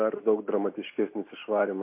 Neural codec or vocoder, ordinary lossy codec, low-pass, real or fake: none; MP3, 24 kbps; 3.6 kHz; real